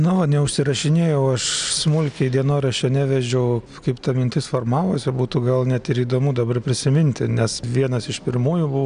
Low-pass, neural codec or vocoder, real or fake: 9.9 kHz; none; real